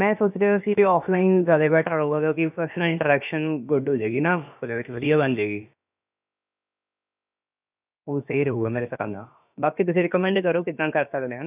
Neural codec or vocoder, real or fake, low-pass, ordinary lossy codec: codec, 16 kHz, about 1 kbps, DyCAST, with the encoder's durations; fake; 3.6 kHz; none